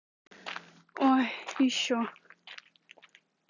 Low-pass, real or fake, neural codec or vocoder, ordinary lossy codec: 7.2 kHz; real; none; none